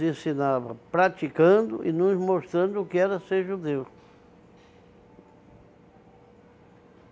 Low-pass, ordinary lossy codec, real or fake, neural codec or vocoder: none; none; real; none